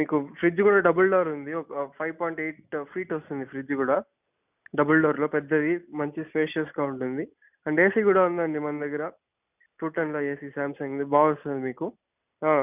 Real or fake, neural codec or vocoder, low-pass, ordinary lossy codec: real; none; 3.6 kHz; none